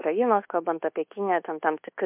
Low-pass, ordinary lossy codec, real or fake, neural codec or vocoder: 3.6 kHz; MP3, 32 kbps; fake; codec, 24 kHz, 1.2 kbps, DualCodec